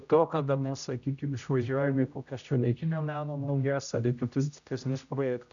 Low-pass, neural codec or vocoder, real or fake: 7.2 kHz; codec, 16 kHz, 0.5 kbps, X-Codec, HuBERT features, trained on general audio; fake